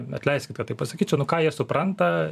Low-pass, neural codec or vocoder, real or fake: 14.4 kHz; vocoder, 44.1 kHz, 128 mel bands every 256 samples, BigVGAN v2; fake